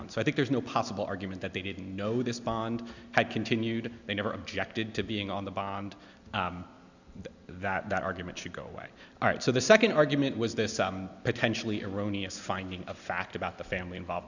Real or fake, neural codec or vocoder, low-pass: real; none; 7.2 kHz